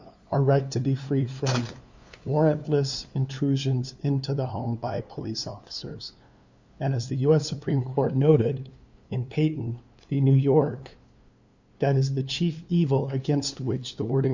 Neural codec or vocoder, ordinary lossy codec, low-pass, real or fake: codec, 16 kHz, 2 kbps, FunCodec, trained on LibriTTS, 25 frames a second; Opus, 64 kbps; 7.2 kHz; fake